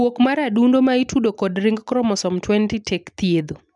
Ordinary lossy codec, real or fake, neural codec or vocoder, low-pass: none; real; none; 10.8 kHz